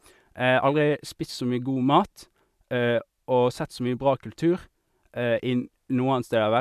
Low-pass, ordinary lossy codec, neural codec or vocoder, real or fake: 14.4 kHz; none; none; real